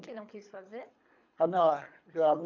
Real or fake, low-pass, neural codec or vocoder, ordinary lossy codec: fake; 7.2 kHz; codec, 24 kHz, 3 kbps, HILCodec; MP3, 48 kbps